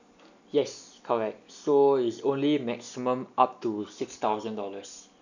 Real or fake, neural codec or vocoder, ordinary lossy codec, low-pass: real; none; none; 7.2 kHz